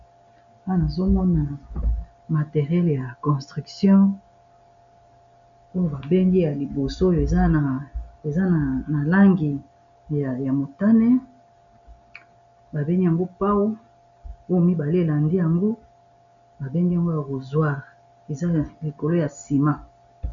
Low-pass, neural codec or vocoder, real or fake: 7.2 kHz; none; real